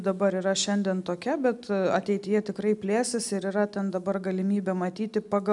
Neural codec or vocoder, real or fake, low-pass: none; real; 10.8 kHz